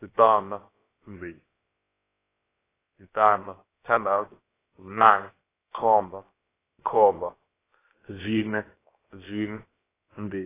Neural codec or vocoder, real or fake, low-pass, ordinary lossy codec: codec, 16 kHz, about 1 kbps, DyCAST, with the encoder's durations; fake; 3.6 kHz; AAC, 16 kbps